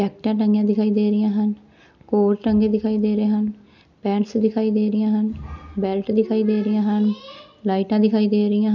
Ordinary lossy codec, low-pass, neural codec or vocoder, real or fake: none; 7.2 kHz; none; real